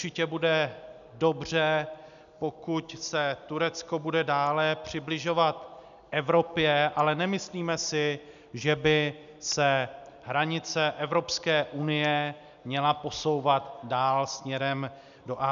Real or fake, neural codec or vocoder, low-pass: real; none; 7.2 kHz